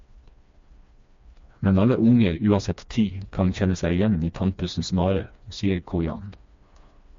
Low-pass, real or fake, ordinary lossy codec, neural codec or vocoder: 7.2 kHz; fake; MP3, 48 kbps; codec, 16 kHz, 2 kbps, FreqCodec, smaller model